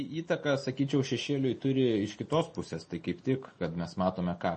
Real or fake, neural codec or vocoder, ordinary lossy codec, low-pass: real; none; MP3, 32 kbps; 10.8 kHz